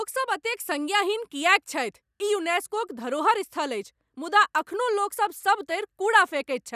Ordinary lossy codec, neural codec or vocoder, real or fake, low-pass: none; none; real; 14.4 kHz